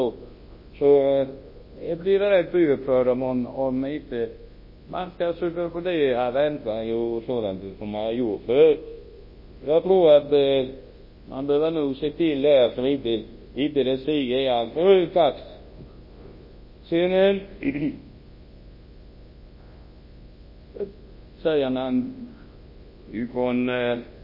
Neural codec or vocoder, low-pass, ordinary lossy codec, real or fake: codec, 24 kHz, 0.9 kbps, WavTokenizer, large speech release; 5.4 kHz; MP3, 24 kbps; fake